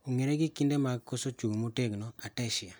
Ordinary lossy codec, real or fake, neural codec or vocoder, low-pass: none; real; none; none